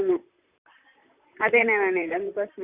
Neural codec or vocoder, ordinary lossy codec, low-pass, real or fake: vocoder, 44.1 kHz, 128 mel bands, Pupu-Vocoder; Opus, 64 kbps; 3.6 kHz; fake